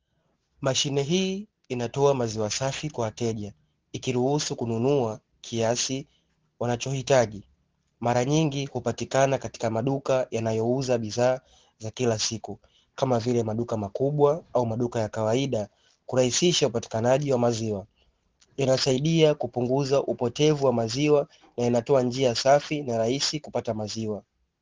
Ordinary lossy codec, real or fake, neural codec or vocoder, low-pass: Opus, 16 kbps; real; none; 7.2 kHz